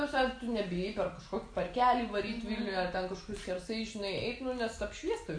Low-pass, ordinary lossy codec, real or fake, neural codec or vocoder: 9.9 kHz; AAC, 48 kbps; real; none